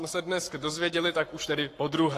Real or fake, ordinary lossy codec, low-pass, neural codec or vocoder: fake; AAC, 48 kbps; 14.4 kHz; codec, 44.1 kHz, 7.8 kbps, Pupu-Codec